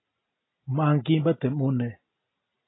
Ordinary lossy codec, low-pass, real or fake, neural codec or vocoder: AAC, 16 kbps; 7.2 kHz; real; none